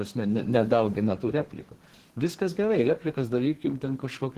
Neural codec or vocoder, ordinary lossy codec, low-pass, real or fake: codec, 32 kHz, 1.9 kbps, SNAC; Opus, 16 kbps; 14.4 kHz; fake